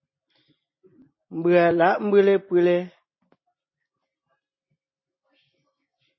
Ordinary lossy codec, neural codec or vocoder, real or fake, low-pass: MP3, 24 kbps; none; real; 7.2 kHz